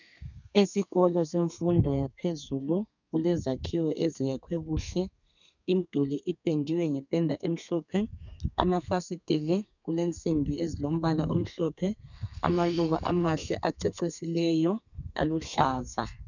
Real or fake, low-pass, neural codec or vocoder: fake; 7.2 kHz; codec, 32 kHz, 1.9 kbps, SNAC